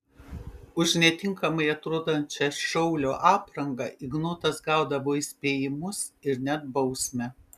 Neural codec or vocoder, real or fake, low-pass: none; real; 14.4 kHz